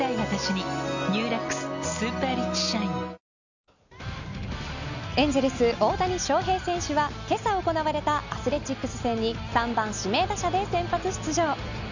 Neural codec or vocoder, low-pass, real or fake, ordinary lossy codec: none; 7.2 kHz; real; none